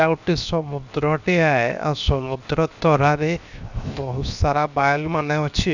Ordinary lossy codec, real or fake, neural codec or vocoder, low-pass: none; fake; codec, 16 kHz, 0.7 kbps, FocalCodec; 7.2 kHz